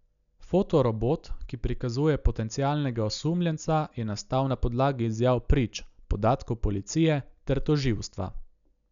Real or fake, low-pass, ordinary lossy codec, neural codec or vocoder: real; 7.2 kHz; none; none